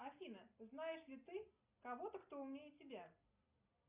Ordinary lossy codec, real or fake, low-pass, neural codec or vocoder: Opus, 64 kbps; real; 3.6 kHz; none